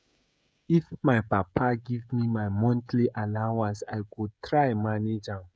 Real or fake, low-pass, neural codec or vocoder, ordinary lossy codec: fake; none; codec, 16 kHz, 16 kbps, FreqCodec, smaller model; none